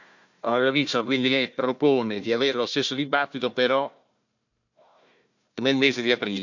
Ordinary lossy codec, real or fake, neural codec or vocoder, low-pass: none; fake; codec, 16 kHz, 1 kbps, FunCodec, trained on Chinese and English, 50 frames a second; 7.2 kHz